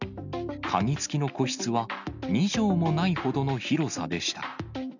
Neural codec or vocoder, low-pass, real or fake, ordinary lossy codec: none; 7.2 kHz; real; none